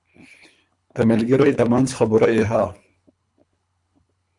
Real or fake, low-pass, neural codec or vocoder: fake; 10.8 kHz; codec, 24 kHz, 3 kbps, HILCodec